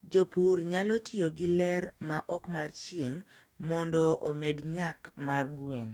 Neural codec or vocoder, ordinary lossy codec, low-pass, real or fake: codec, 44.1 kHz, 2.6 kbps, DAC; none; 19.8 kHz; fake